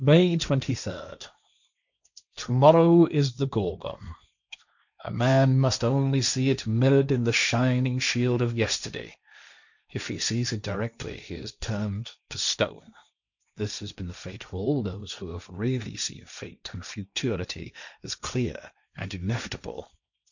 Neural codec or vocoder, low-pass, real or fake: codec, 16 kHz, 1.1 kbps, Voila-Tokenizer; 7.2 kHz; fake